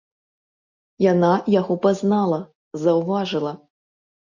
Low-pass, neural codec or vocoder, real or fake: 7.2 kHz; none; real